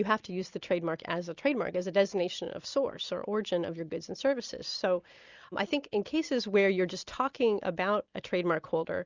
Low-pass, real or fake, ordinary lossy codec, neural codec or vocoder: 7.2 kHz; real; Opus, 64 kbps; none